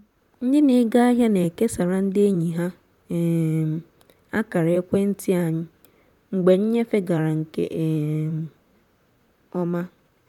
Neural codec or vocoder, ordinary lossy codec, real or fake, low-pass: vocoder, 44.1 kHz, 128 mel bands, Pupu-Vocoder; none; fake; 19.8 kHz